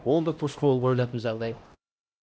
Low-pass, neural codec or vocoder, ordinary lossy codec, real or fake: none; codec, 16 kHz, 1 kbps, X-Codec, HuBERT features, trained on LibriSpeech; none; fake